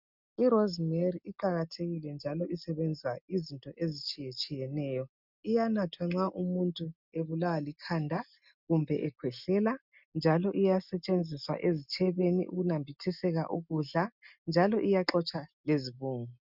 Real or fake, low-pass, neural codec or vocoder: real; 5.4 kHz; none